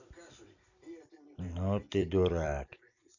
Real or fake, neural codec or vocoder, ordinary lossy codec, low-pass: fake; vocoder, 44.1 kHz, 128 mel bands every 256 samples, BigVGAN v2; none; 7.2 kHz